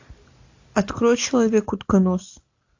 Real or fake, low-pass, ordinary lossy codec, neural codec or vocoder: real; 7.2 kHz; AAC, 48 kbps; none